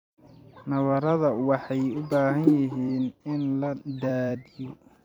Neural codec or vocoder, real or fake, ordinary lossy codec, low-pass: vocoder, 44.1 kHz, 128 mel bands every 256 samples, BigVGAN v2; fake; none; 19.8 kHz